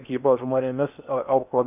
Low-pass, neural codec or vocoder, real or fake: 3.6 kHz; codec, 16 kHz in and 24 kHz out, 0.8 kbps, FocalCodec, streaming, 65536 codes; fake